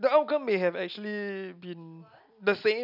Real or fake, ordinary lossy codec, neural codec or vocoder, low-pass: real; none; none; 5.4 kHz